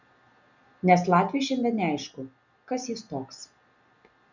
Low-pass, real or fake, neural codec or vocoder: 7.2 kHz; real; none